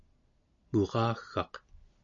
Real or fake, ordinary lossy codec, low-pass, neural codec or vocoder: real; MP3, 96 kbps; 7.2 kHz; none